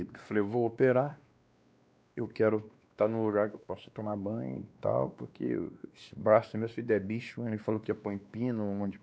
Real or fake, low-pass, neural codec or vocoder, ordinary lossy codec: fake; none; codec, 16 kHz, 2 kbps, X-Codec, WavLM features, trained on Multilingual LibriSpeech; none